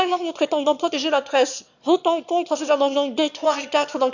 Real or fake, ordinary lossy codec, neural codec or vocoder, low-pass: fake; none; autoencoder, 22.05 kHz, a latent of 192 numbers a frame, VITS, trained on one speaker; 7.2 kHz